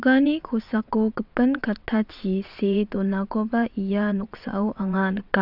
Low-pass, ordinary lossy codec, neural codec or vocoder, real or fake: 5.4 kHz; none; vocoder, 22.05 kHz, 80 mel bands, WaveNeXt; fake